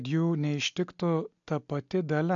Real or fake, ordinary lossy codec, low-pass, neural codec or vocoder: real; AAC, 64 kbps; 7.2 kHz; none